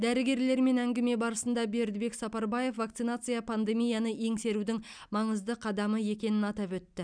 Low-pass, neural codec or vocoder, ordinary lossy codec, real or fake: 9.9 kHz; none; none; real